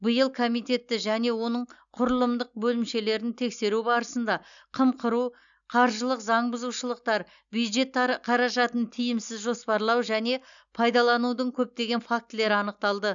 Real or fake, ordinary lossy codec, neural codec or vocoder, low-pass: real; none; none; 7.2 kHz